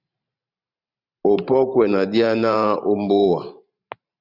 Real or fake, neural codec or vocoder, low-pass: fake; vocoder, 44.1 kHz, 128 mel bands every 512 samples, BigVGAN v2; 5.4 kHz